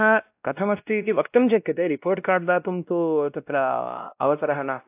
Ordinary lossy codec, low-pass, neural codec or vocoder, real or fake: AAC, 32 kbps; 3.6 kHz; codec, 16 kHz, 1 kbps, X-Codec, WavLM features, trained on Multilingual LibriSpeech; fake